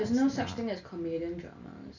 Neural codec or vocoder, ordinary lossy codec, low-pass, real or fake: none; none; 7.2 kHz; real